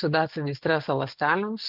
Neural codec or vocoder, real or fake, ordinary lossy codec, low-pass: none; real; Opus, 24 kbps; 5.4 kHz